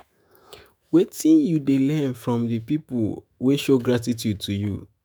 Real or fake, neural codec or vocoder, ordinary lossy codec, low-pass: fake; autoencoder, 48 kHz, 128 numbers a frame, DAC-VAE, trained on Japanese speech; none; none